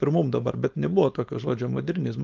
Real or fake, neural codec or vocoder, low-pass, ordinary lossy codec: fake; codec, 16 kHz, 4.8 kbps, FACodec; 7.2 kHz; Opus, 24 kbps